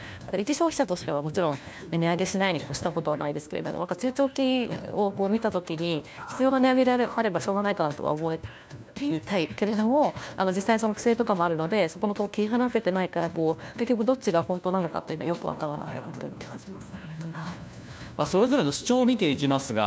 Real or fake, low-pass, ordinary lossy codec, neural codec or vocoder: fake; none; none; codec, 16 kHz, 1 kbps, FunCodec, trained on LibriTTS, 50 frames a second